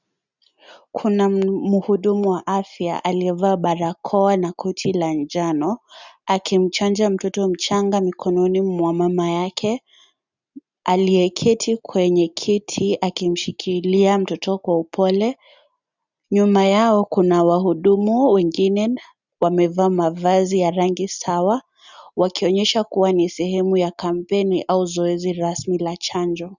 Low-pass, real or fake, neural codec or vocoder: 7.2 kHz; real; none